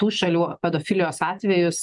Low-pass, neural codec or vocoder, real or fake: 10.8 kHz; none; real